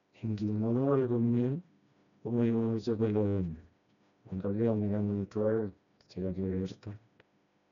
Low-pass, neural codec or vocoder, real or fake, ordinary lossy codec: 7.2 kHz; codec, 16 kHz, 1 kbps, FreqCodec, smaller model; fake; none